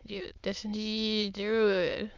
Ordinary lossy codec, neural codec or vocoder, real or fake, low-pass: MP3, 64 kbps; autoencoder, 22.05 kHz, a latent of 192 numbers a frame, VITS, trained on many speakers; fake; 7.2 kHz